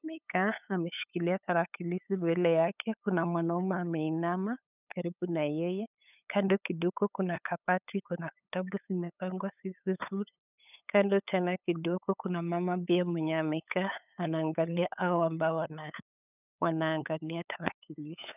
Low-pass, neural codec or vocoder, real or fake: 3.6 kHz; codec, 16 kHz, 8 kbps, FunCodec, trained on LibriTTS, 25 frames a second; fake